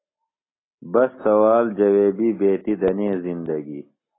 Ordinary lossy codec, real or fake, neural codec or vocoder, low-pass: AAC, 16 kbps; real; none; 7.2 kHz